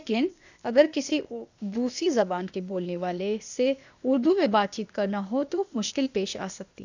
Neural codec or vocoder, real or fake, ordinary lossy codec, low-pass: codec, 16 kHz, 0.8 kbps, ZipCodec; fake; none; 7.2 kHz